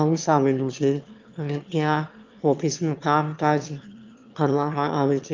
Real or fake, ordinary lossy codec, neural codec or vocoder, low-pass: fake; Opus, 32 kbps; autoencoder, 22.05 kHz, a latent of 192 numbers a frame, VITS, trained on one speaker; 7.2 kHz